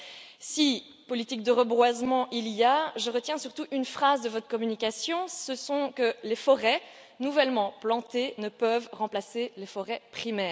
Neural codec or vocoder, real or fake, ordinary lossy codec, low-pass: none; real; none; none